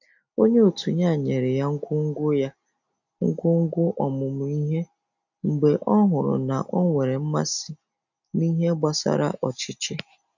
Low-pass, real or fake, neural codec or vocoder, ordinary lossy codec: 7.2 kHz; real; none; none